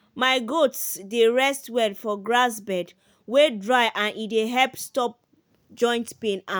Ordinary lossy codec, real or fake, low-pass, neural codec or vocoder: none; real; none; none